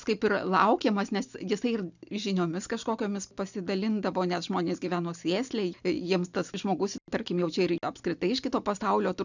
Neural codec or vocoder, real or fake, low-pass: none; real; 7.2 kHz